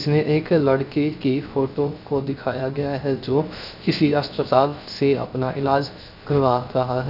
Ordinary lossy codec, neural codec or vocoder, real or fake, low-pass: none; codec, 16 kHz, 0.3 kbps, FocalCodec; fake; 5.4 kHz